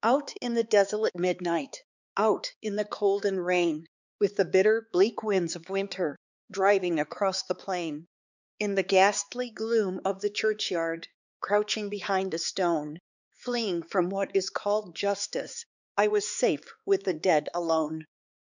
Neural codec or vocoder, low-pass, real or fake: codec, 16 kHz, 4 kbps, X-Codec, HuBERT features, trained on balanced general audio; 7.2 kHz; fake